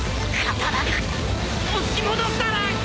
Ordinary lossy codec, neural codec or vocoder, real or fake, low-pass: none; none; real; none